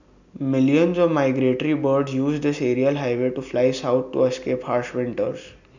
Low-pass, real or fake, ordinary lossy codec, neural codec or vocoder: 7.2 kHz; real; none; none